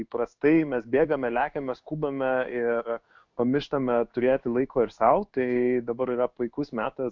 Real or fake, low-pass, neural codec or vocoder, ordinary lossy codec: fake; 7.2 kHz; codec, 16 kHz in and 24 kHz out, 1 kbps, XY-Tokenizer; Opus, 64 kbps